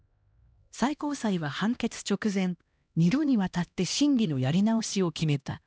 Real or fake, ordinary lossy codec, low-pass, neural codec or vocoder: fake; none; none; codec, 16 kHz, 1 kbps, X-Codec, HuBERT features, trained on LibriSpeech